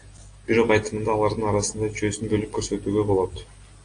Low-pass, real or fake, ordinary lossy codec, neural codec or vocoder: 9.9 kHz; real; AAC, 48 kbps; none